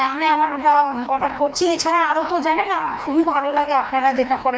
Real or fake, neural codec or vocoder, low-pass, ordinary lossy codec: fake; codec, 16 kHz, 1 kbps, FreqCodec, larger model; none; none